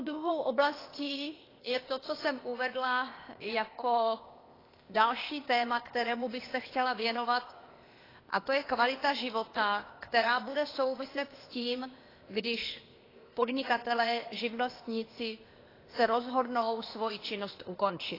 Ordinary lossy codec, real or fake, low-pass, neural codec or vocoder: AAC, 24 kbps; fake; 5.4 kHz; codec, 16 kHz, 0.8 kbps, ZipCodec